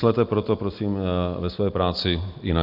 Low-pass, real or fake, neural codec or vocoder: 5.4 kHz; real; none